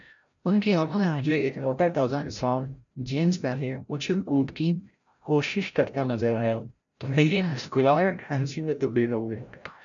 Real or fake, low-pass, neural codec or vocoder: fake; 7.2 kHz; codec, 16 kHz, 0.5 kbps, FreqCodec, larger model